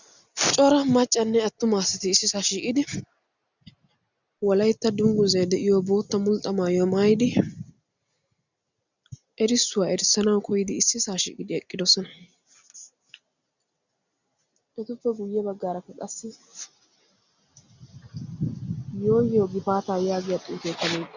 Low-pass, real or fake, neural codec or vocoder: 7.2 kHz; real; none